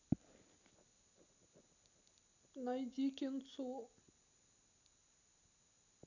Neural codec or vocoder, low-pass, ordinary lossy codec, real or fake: none; 7.2 kHz; none; real